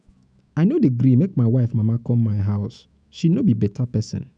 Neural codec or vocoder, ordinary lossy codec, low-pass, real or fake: autoencoder, 48 kHz, 128 numbers a frame, DAC-VAE, trained on Japanese speech; none; 9.9 kHz; fake